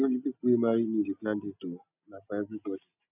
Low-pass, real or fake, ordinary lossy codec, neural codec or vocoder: 3.6 kHz; real; none; none